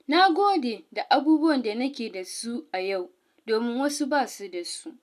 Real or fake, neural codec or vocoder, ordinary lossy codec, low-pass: real; none; none; 14.4 kHz